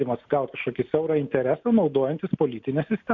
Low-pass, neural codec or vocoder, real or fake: 7.2 kHz; none; real